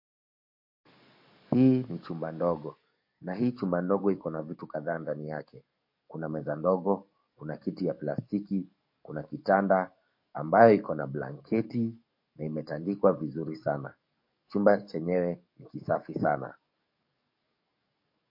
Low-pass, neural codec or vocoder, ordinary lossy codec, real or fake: 5.4 kHz; codec, 44.1 kHz, 7.8 kbps, DAC; MP3, 32 kbps; fake